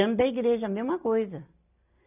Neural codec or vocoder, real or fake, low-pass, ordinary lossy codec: none; real; 3.6 kHz; none